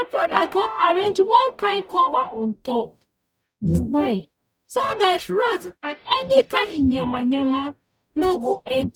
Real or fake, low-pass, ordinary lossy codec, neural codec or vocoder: fake; 19.8 kHz; none; codec, 44.1 kHz, 0.9 kbps, DAC